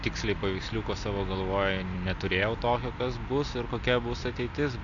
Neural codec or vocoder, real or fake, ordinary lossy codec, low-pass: none; real; AAC, 64 kbps; 7.2 kHz